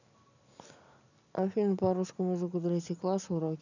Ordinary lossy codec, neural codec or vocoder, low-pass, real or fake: none; none; 7.2 kHz; real